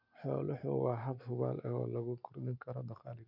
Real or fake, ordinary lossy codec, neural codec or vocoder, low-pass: real; none; none; 5.4 kHz